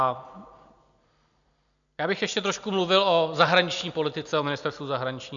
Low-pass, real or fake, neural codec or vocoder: 7.2 kHz; real; none